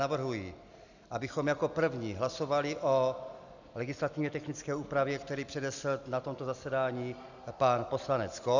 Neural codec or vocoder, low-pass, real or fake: none; 7.2 kHz; real